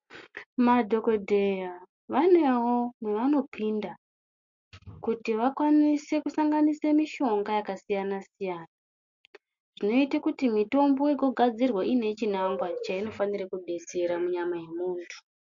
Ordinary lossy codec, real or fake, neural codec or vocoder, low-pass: MP3, 48 kbps; real; none; 7.2 kHz